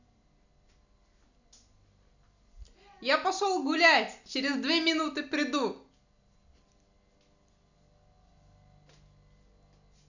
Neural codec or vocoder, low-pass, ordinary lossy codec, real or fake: none; 7.2 kHz; none; real